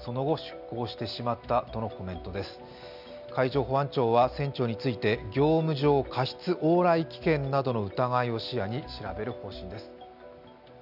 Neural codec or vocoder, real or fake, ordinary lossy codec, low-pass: none; real; none; 5.4 kHz